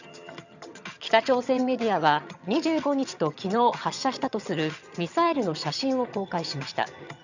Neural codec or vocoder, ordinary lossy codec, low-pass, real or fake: vocoder, 22.05 kHz, 80 mel bands, HiFi-GAN; none; 7.2 kHz; fake